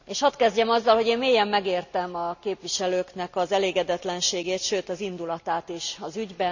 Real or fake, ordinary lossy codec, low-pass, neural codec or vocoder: real; none; 7.2 kHz; none